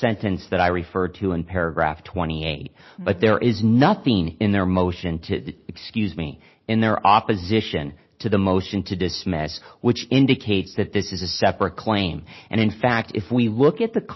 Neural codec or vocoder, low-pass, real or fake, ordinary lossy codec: none; 7.2 kHz; real; MP3, 24 kbps